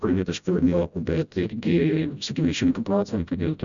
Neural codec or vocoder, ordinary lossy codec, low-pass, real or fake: codec, 16 kHz, 0.5 kbps, FreqCodec, smaller model; MP3, 96 kbps; 7.2 kHz; fake